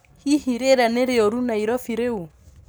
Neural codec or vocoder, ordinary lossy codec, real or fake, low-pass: none; none; real; none